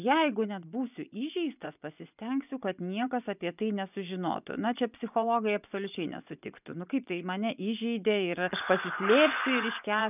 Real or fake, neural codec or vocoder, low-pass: fake; vocoder, 24 kHz, 100 mel bands, Vocos; 3.6 kHz